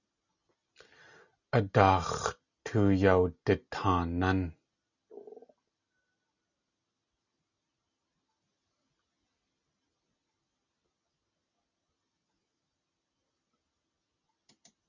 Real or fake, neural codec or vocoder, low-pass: real; none; 7.2 kHz